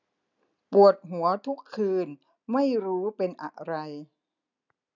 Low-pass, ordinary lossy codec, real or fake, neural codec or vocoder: 7.2 kHz; none; real; none